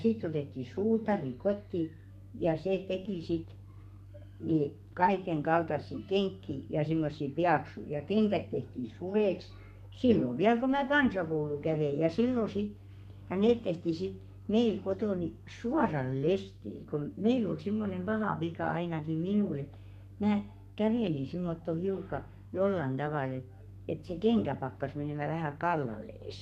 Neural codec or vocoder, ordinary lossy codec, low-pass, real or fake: codec, 32 kHz, 1.9 kbps, SNAC; none; 14.4 kHz; fake